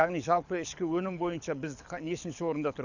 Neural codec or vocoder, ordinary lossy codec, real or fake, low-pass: codec, 24 kHz, 6 kbps, HILCodec; none; fake; 7.2 kHz